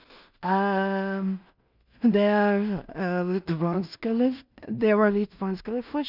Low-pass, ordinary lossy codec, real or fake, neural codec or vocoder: 5.4 kHz; none; fake; codec, 16 kHz in and 24 kHz out, 0.4 kbps, LongCat-Audio-Codec, two codebook decoder